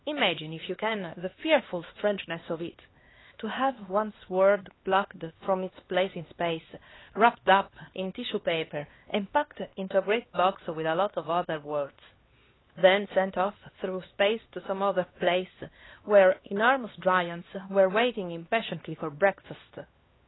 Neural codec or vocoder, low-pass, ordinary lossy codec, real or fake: codec, 16 kHz, 2 kbps, X-Codec, HuBERT features, trained on LibriSpeech; 7.2 kHz; AAC, 16 kbps; fake